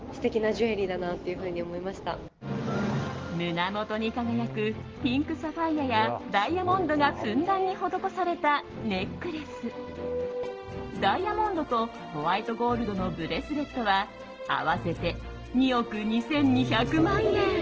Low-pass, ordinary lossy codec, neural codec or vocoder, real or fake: 7.2 kHz; Opus, 16 kbps; none; real